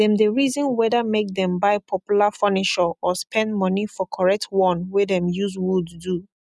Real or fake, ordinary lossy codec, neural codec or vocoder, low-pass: real; none; none; none